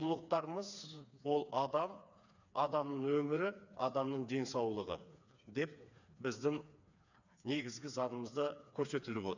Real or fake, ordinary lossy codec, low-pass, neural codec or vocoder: fake; none; 7.2 kHz; codec, 16 kHz, 4 kbps, FreqCodec, smaller model